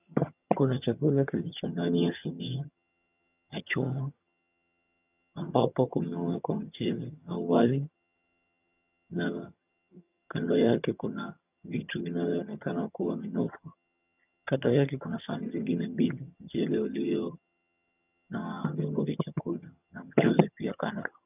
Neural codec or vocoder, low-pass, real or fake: vocoder, 22.05 kHz, 80 mel bands, HiFi-GAN; 3.6 kHz; fake